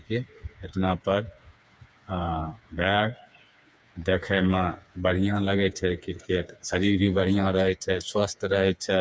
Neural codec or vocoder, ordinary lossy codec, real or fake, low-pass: codec, 16 kHz, 4 kbps, FreqCodec, smaller model; none; fake; none